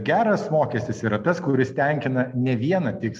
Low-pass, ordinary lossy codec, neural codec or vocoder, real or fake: 14.4 kHz; MP3, 64 kbps; none; real